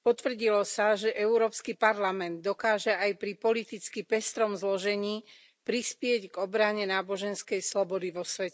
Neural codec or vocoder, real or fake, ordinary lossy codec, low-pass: none; real; none; none